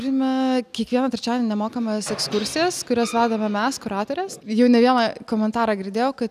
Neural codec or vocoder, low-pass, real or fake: none; 14.4 kHz; real